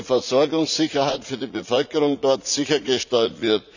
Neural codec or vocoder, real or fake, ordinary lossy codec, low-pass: vocoder, 44.1 kHz, 80 mel bands, Vocos; fake; none; 7.2 kHz